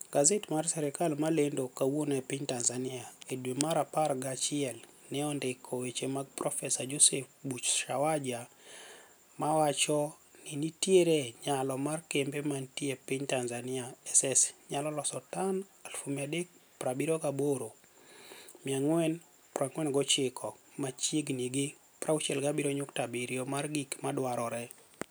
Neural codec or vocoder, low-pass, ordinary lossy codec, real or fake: none; none; none; real